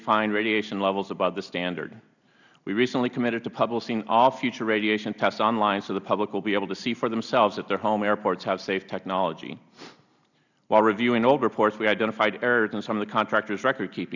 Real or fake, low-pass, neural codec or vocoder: real; 7.2 kHz; none